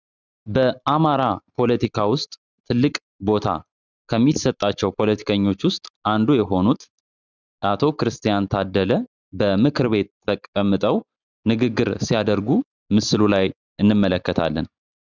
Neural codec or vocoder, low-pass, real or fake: none; 7.2 kHz; real